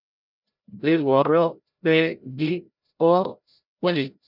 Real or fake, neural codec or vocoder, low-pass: fake; codec, 16 kHz, 0.5 kbps, FreqCodec, larger model; 5.4 kHz